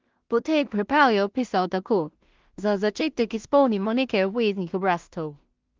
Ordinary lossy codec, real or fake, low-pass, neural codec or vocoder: Opus, 32 kbps; fake; 7.2 kHz; codec, 16 kHz in and 24 kHz out, 0.4 kbps, LongCat-Audio-Codec, two codebook decoder